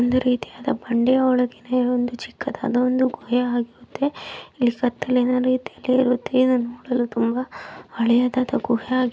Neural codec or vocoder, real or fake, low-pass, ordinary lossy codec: none; real; none; none